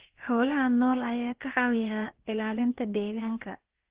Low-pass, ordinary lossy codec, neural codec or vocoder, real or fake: 3.6 kHz; Opus, 16 kbps; codec, 16 kHz, about 1 kbps, DyCAST, with the encoder's durations; fake